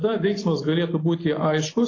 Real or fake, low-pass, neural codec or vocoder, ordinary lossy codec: real; 7.2 kHz; none; AAC, 32 kbps